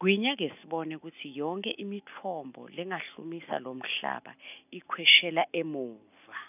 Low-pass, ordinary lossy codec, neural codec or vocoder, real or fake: 3.6 kHz; none; none; real